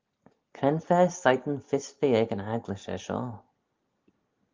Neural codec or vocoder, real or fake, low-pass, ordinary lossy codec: none; real; 7.2 kHz; Opus, 32 kbps